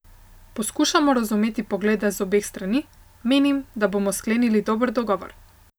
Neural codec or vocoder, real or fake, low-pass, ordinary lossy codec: none; real; none; none